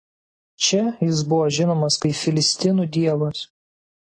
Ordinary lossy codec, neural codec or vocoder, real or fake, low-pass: AAC, 32 kbps; none; real; 9.9 kHz